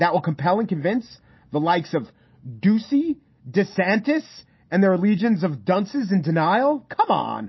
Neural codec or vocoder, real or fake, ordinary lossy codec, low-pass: none; real; MP3, 24 kbps; 7.2 kHz